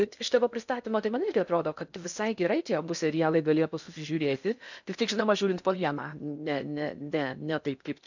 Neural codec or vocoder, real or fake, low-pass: codec, 16 kHz in and 24 kHz out, 0.6 kbps, FocalCodec, streaming, 4096 codes; fake; 7.2 kHz